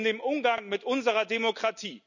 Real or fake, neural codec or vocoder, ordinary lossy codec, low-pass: real; none; none; 7.2 kHz